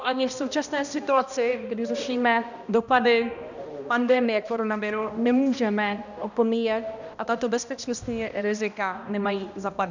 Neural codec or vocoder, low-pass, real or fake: codec, 16 kHz, 1 kbps, X-Codec, HuBERT features, trained on balanced general audio; 7.2 kHz; fake